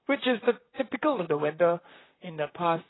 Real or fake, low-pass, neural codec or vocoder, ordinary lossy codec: fake; 7.2 kHz; vocoder, 44.1 kHz, 128 mel bands, Pupu-Vocoder; AAC, 16 kbps